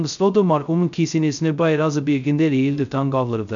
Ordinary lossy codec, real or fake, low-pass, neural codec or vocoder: none; fake; 7.2 kHz; codec, 16 kHz, 0.2 kbps, FocalCodec